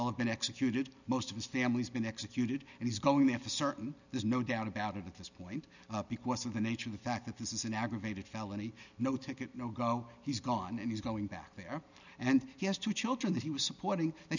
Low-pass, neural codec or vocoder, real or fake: 7.2 kHz; none; real